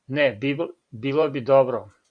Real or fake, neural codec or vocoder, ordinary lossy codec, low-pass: fake; vocoder, 44.1 kHz, 128 mel bands every 512 samples, BigVGAN v2; AAC, 64 kbps; 9.9 kHz